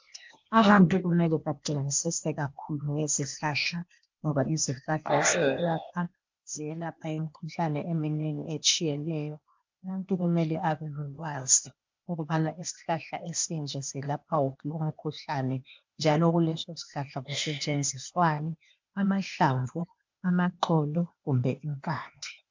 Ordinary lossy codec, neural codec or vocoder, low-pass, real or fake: MP3, 64 kbps; codec, 16 kHz, 0.8 kbps, ZipCodec; 7.2 kHz; fake